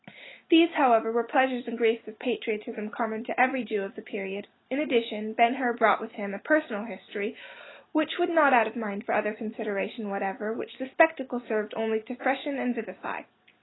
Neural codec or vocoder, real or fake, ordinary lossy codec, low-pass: none; real; AAC, 16 kbps; 7.2 kHz